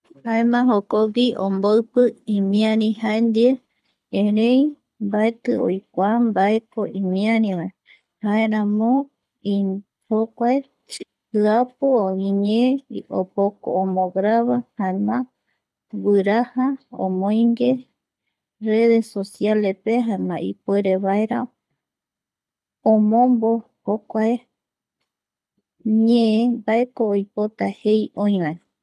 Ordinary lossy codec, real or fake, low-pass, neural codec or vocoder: none; fake; none; codec, 24 kHz, 6 kbps, HILCodec